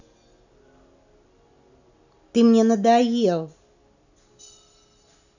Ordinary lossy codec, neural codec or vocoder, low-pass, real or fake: none; none; 7.2 kHz; real